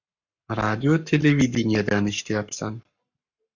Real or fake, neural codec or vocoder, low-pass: fake; codec, 44.1 kHz, 7.8 kbps, Pupu-Codec; 7.2 kHz